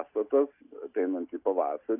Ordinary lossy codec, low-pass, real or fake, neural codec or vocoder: AAC, 32 kbps; 3.6 kHz; real; none